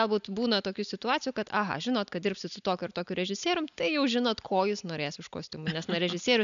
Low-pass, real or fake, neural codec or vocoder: 7.2 kHz; real; none